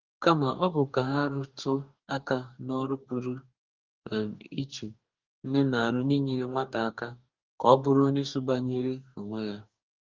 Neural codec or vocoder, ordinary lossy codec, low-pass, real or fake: codec, 44.1 kHz, 2.6 kbps, DAC; Opus, 32 kbps; 7.2 kHz; fake